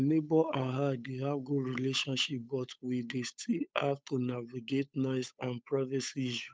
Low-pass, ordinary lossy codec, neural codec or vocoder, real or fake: none; none; codec, 16 kHz, 8 kbps, FunCodec, trained on Chinese and English, 25 frames a second; fake